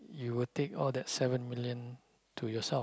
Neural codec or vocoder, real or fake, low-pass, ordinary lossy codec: none; real; none; none